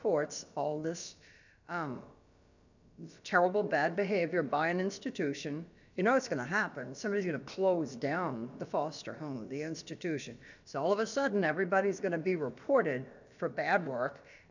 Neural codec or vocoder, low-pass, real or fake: codec, 16 kHz, about 1 kbps, DyCAST, with the encoder's durations; 7.2 kHz; fake